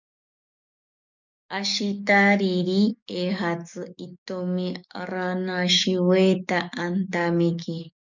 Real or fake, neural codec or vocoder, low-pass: fake; codec, 44.1 kHz, 7.8 kbps, DAC; 7.2 kHz